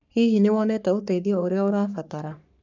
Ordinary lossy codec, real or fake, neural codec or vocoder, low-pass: none; fake; codec, 44.1 kHz, 3.4 kbps, Pupu-Codec; 7.2 kHz